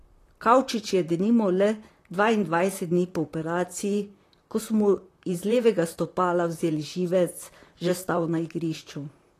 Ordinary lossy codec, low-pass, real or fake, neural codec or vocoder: AAC, 48 kbps; 14.4 kHz; fake; vocoder, 44.1 kHz, 128 mel bands, Pupu-Vocoder